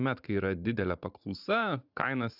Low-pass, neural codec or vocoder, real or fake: 5.4 kHz; vocoder, 44.1 kHz, 80 mel bands, Vocos; fake